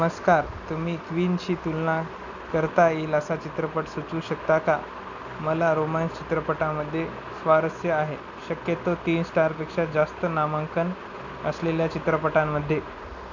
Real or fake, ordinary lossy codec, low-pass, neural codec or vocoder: real; none; 7.2 kHz; none